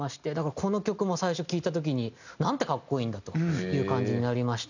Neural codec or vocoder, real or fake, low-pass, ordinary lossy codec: none; real; 7.2 kHz; none